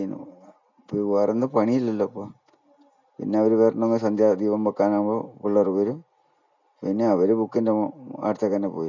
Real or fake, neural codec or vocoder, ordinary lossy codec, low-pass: real; none; none; 7.2 kHz